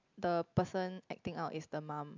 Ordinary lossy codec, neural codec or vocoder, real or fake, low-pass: MP3, 64 kbps; none; real; 7.2 kHz